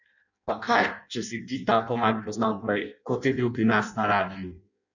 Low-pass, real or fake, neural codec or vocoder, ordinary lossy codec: 7.2 kHz; fake; codec, 16 kHz in and 24 kHz out, 0.6 kbps, FireRedTTS-2 codec; none